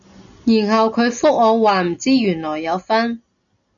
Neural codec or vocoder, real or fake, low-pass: none; real; 7.2 kHz